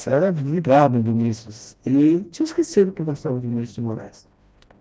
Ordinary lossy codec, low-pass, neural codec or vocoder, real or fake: none; none; codec, 16 kHz, 1 kbps, FreqCodec, smaller model; fake